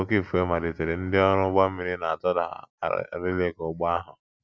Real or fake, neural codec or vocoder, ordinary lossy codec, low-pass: real; none; none; none